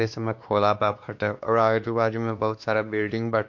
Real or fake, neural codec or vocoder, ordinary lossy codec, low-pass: fake; codec, 16 kHz, 2 kbps, X-Codec, WavLM features, trained on Multilingual LibriSpeech; MP3, 48 kbps; 7.2 kHz